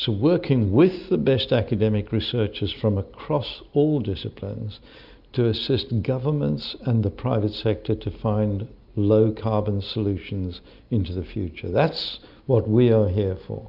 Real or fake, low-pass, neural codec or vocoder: real; 5.4 kHz; none